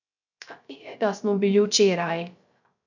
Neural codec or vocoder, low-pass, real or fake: codec, 16 kHz, 0.3 kbps, FocalCodec; 7.2 kHz; fake